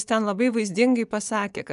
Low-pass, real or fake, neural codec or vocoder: 10.8 kHz; fake; vocoder, 24 kHz, 100 mel bands, Vocos